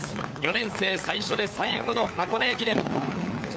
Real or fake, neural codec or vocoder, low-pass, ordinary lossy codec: fake; codec, 16 kHz, 4 kbps, FunCodec, trained on LibriTTS, 50 frames a second; none; none